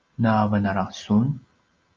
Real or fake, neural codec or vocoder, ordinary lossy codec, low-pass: real; none; Opus, 24 kbps; 7.2 kHz